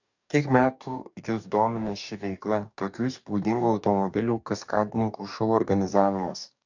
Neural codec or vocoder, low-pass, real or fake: codec, 44.1 kHz, 2.6 kbps, DAC; 7.2 kHz; fake